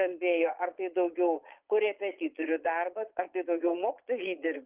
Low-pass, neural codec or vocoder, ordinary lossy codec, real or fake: 3.6 kHz; vocoder, 22.05 kHz, 80 mel bands, Vocos; Opus, 32 kbps; fake